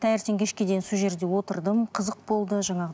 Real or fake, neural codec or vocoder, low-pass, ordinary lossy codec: real; none; none; none